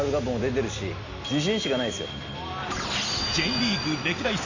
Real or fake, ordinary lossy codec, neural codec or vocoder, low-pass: real; none; none; 7.2 kHz